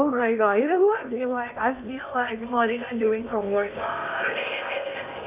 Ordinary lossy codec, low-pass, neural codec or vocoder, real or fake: none; 3.6 kHz; codec, 16 kHz in and 24 kHz out, 0.8 kbps, FocalCodec, streaming, 65536 codes; fake